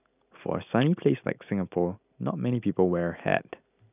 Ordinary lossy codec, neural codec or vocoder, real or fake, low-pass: none; none; real; 3.6 kHz